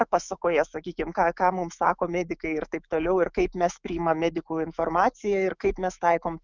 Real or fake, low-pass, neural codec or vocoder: fake; 7.2 kHz; autoencoder, 48 kHz, 128 numbers a frame, DAC-VAE, trained on Japanese speech